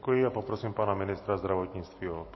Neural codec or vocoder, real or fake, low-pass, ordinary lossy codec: none; real; 7.2 kHz; MP3, 24 kbps